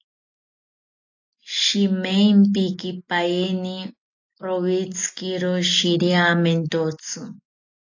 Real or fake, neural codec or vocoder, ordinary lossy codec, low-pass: real; none; AAC, 48 kbps; 7.2 kHz